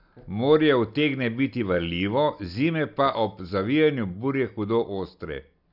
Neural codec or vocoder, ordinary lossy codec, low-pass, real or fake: none; none; 5.4 kHz; real